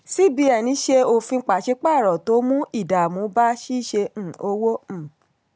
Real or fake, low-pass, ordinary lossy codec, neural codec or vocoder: real; none; none; none